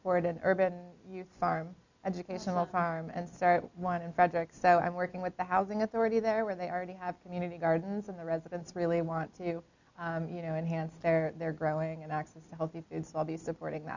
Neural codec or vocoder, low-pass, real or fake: none; 7.2 kHz; real